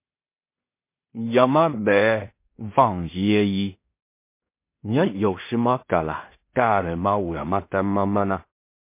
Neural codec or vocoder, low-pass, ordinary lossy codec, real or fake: codec, 16 kHz in and 24 kHz out, 0.4 kbps, LongCat-Audio-Codec, two codebook decoder; 3.6 kHz; MP3, 24 kbps; fake